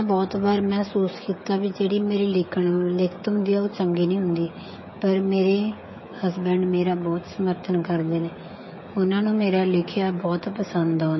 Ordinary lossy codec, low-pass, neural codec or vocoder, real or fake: MP3, 24 kbps; 7.2 kHz; codec, 16 kHz, 16 kbps, FreqCodec, smaller model; fake